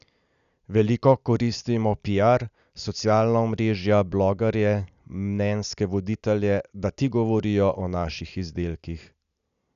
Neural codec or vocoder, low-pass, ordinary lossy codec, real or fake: none; 7.2 kHz; none; real